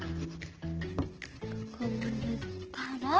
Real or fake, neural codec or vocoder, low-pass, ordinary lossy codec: real; none; 7.2 kHz; Opus, 16 kbps